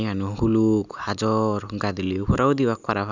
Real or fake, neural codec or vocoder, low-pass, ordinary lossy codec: real; none; 7.2 kHz; none